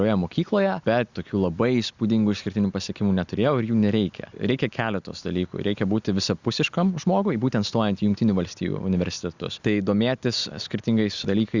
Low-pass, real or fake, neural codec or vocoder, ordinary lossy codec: 7.2 kHz; real; none; Opus, 64 kbps